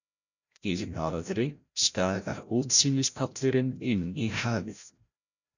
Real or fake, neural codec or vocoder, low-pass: fake; codec, 16 kHz, 0.5 kbps, FreqCodec, larger model; 7.2 kHz